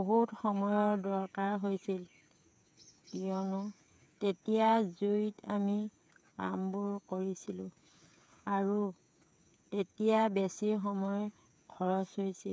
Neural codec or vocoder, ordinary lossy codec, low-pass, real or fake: codec, 16 kHz, 8 kbps, FreqCodec, smaller model; none; none; fake